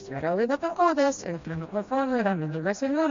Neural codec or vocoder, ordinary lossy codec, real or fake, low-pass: codec, 16 kHz, 1 kbps, FreqCodec, smaller model; MP3, 48 kbps; fake; 7.2 kHz